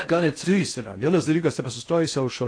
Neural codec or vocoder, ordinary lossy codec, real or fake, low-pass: codec, 16 kHz in and 24 kHz out, 0.6 kbps, FocalCodec, streaming, 4096 codes; AAC, 48 kbps; fake; 9.9 kHz